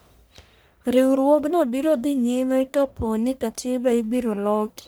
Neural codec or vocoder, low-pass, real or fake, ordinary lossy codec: codec, 44.1 kHz, 1.7 kbps, Pupu-Codec; none; fake; none